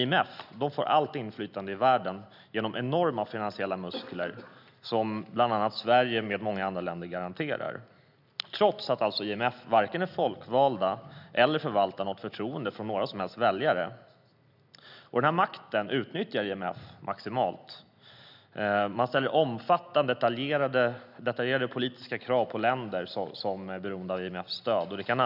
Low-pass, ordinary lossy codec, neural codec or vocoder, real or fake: 5.4 kHz; none; none; real